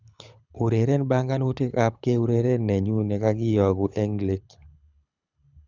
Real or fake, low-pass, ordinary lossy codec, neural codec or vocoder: fake; 7.2 kHz; none; codec, 24 kHz, 6 kbps, HILCodec